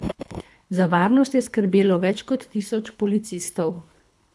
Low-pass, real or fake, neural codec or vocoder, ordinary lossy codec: none; fake; codec, 24 kHz, 3 kbps, HILCodec; none